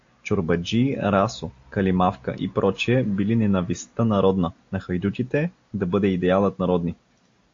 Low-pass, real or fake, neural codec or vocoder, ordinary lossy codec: 7.2 kHz; real; none; AAC, 48 kbps